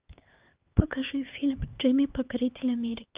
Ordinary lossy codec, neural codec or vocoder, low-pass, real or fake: Opus, 24 kbps; codec, 16 kHz, 4 kbps, X-Codec, HuBERT features, trained on LibriSpeech; 3.6 kHz; fake